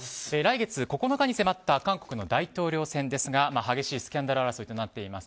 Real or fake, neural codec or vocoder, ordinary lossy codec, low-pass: real; none; none; none